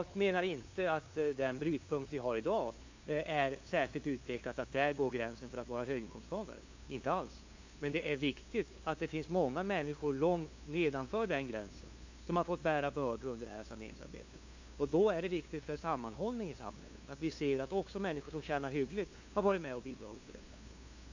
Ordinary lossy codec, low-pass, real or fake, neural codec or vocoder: AAC, 48 kbps; 7.2 kHz; fake; codec, 16 kHz, 2 kbps, FunCodec, trained on LibriTTS, 25 frames a second